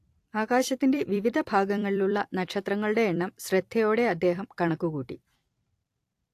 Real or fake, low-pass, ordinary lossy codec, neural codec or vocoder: fake; 14.4 kHz; AAC, 64 kbps; vocoder, 44.1 kHz, 128 mel bands every 256 samples, BigVGAN v2